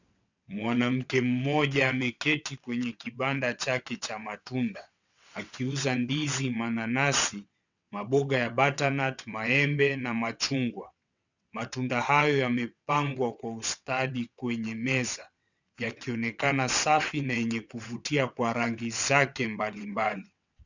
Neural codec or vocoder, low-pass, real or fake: vocoder, 22.05 kHz, 80 mel bands, WaveNeXt; 7.2 kHz; fake